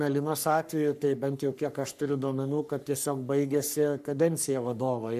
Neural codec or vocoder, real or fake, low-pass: codec, 44.1 kHz, 3.4 kbps, Pupu-Codec; fake; 14.4 kHz